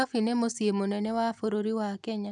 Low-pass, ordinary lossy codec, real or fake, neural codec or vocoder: 10.8 kHz; none; real; none